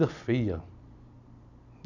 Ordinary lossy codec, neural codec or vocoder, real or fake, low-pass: none; none; real; 7.2 kHz